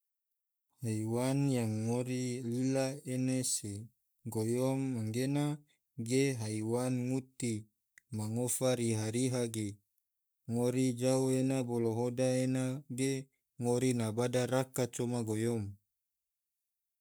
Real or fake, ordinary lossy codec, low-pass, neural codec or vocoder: fake; none; none; codec, 44.1 kHz, 7.8 kbps, Pupu-Codec